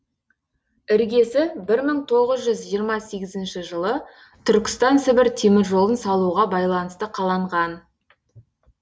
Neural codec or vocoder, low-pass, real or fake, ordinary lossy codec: none; none; real; none